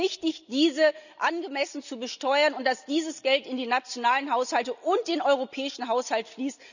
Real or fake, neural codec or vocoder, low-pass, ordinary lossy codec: real; none; 7.2 kHz; none